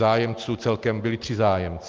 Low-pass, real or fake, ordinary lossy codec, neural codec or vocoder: 7.2 kHz; real; Opus, 32 kbps; none